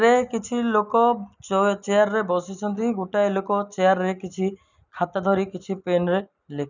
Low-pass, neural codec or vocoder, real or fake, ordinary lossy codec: 7.2 kHz; none; real; none